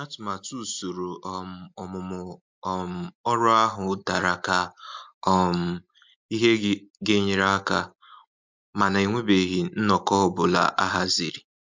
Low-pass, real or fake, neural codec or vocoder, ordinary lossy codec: 7.2 kHz; real; none; MP3, 64 kbps